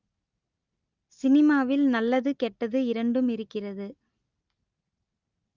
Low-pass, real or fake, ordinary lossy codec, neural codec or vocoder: 7.2 kHz; real; Opus, 24 kbps; none